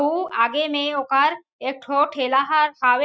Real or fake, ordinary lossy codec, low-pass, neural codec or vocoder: real; none; none; none